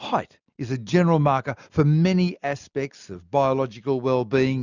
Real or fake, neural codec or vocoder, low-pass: real; none; 7.2 kHz